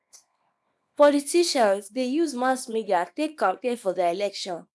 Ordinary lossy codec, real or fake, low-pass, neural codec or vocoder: none; fake; none; codec, 24 kHz, 0.9 kbps, WavTokenizer, small release